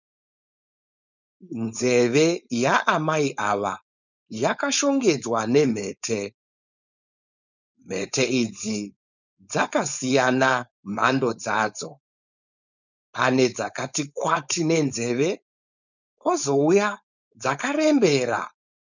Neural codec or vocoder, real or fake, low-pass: codec, 16 kHz, 4.8 kbps, FACodec; fake; 7.2 kHz